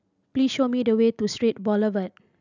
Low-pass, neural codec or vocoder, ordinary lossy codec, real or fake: 7.2 kHz; none; none; real